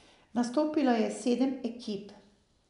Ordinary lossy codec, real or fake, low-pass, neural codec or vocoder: none; real; 10.8 kHz; none